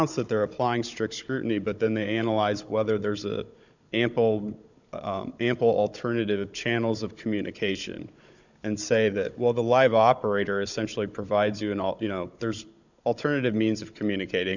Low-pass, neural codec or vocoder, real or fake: 7.2 kHz; codec, 16 kHz, 16 kbps, FunCodec, trained on Chinese and English, 50 frames a second; fake